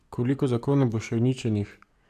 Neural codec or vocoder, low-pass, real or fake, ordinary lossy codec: codec, 44.1 kHz, 7.8 kbps, DAC; 14.4 kHz; fake; none